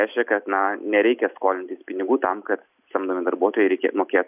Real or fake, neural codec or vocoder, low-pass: real; none; 3.6 kHz